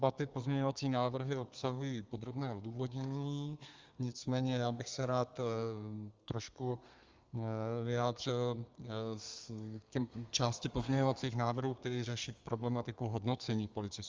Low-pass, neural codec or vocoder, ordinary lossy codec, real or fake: 7.2 kHz; codec, 32 kHz, 1.9 kbps, SNAC; Opus, 32 kbps; fake